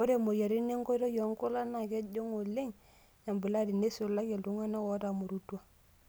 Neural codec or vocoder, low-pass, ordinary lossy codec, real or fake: none; none; none; real